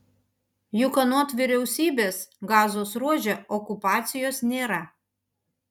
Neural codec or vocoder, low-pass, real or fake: none; 19.8 kHz; real